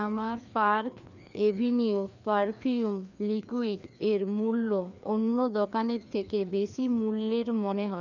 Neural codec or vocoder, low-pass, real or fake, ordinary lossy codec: codec, 16 kHz, 2 kbps, FreqCodec, larger model; 7.2 kHz; fake; none